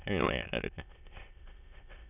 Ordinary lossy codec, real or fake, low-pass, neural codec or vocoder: none; fake; 3.6 kHz; autoencoder, 22.05 kHz, a latent of 192 numbers a frame, VITS, trained on many speakers